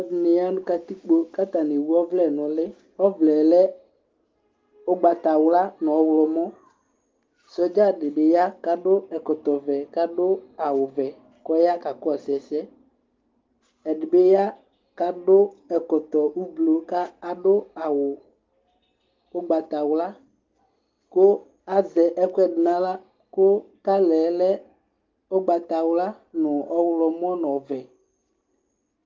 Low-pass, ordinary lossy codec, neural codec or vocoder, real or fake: 7.2 kHz; Opus, 32 kbps; none; real